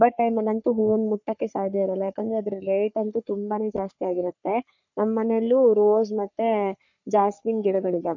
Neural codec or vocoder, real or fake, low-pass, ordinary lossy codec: codec, 44.1 kHz, 3.4 kbps, Pupu-Codec; fake; 7.2 kHz; none